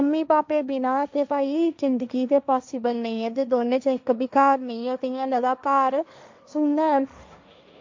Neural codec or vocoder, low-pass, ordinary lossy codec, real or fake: codec, 16 kHz, 1.1 kbps, Voila-Tokenizer; 7.2 kHz; MP3, 64 kbps; fake